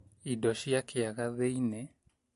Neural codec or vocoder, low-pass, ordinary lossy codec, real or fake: none; 14.4 kHz; MP3, 48 kbps; real